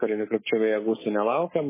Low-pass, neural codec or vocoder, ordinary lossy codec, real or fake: 3.6 kHz; codec, 24 kHz, 3.1 kbps, DualCodec; MP3, 16 kbps; fake